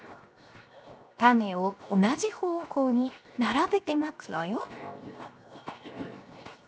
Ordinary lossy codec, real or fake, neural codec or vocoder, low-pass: none; fake; codec, 16 kHz, 0.7 kbps, FocalCodec; none